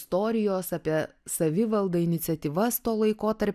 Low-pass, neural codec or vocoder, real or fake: 14.4 kHz; none; real